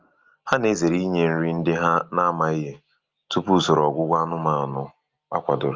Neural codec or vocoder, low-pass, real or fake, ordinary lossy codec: none; 7.2 kHz; real; Opus, 24 kbps